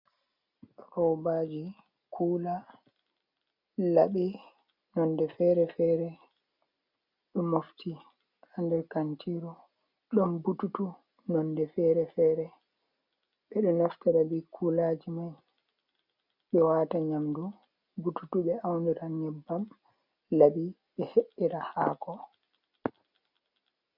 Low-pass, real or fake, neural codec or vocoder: 5.4 kHz; real; none